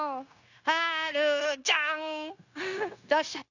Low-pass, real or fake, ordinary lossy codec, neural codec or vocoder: 7.2 kHz; fake; none; codec, 16 kHz, 0.9 kbps, LongCat-Audio-Codec